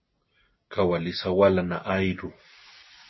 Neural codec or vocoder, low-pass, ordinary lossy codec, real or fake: none; 7.2 kHz; MP3, 24 kbps; real